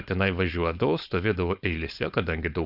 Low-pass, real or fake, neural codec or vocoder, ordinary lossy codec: 5.4 kHz; fake; codec, 16 kHz, 4.8 kbps, FACodec; Opus, 64 kbps